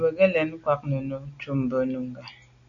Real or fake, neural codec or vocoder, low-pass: real; none; 7.2 kHz